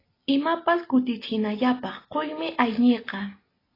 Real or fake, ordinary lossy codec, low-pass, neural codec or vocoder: real; AAC, 24 kbps; 5.4 kHz; none